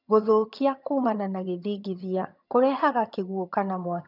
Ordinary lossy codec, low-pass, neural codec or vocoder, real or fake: none; 5.4 kHz; vocoder, 22.05 kHz, 80 mel bands, HiFi-GAN; fake